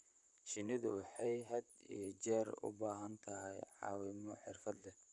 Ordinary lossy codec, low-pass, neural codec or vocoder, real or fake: none; 10.8 kHz; vocoder, 44.1 kHz, 128 mel bands, Pupu-Vocoder; fake